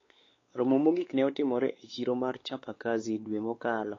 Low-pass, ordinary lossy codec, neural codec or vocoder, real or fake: 7.2 kHz; AAC, 32 kbps; codec, 16 kHz, 4 kbps, X-Codec, WavLM features, trained on Multilingual LibriSpeech; fake